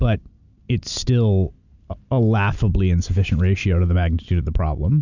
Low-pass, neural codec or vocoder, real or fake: 7.2 kHz; none; real